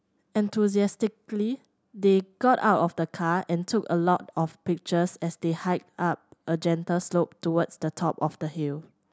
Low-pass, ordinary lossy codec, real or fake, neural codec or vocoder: none; none; real; none